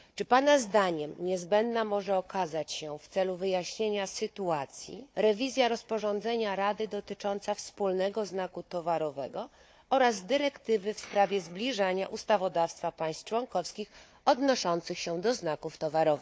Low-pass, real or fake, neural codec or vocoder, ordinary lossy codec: none; fake; codec, 16 kHz, 4 kbps, FunCodec, trained on Chinese and English, 50 frames a second; none